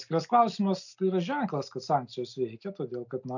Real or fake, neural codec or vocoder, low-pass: real; none; 7.2 kHz